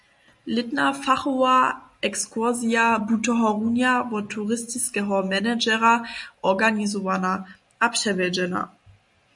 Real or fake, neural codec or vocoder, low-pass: real; none; 10.8 kHz